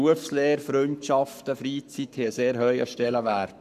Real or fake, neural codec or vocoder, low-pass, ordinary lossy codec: fake; codec, 44.1 kHz, 7.8 kbps, Pupu-Codec; 14.4 kHz; none